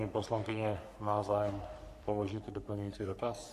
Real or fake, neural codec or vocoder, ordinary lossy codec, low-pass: fake; codec, 44.1 kHz, 3.4 kbps, Pupu-Codec; MP3, 64 kbps; 14.4 kHz